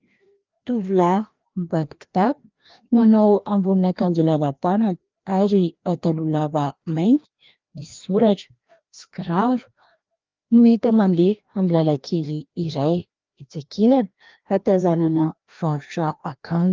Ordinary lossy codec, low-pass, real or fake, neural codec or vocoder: Opus, 24 kbps; 7.2 kHz; fake; codec, 16 kHz, 1 kbps, FreqCodec, larger model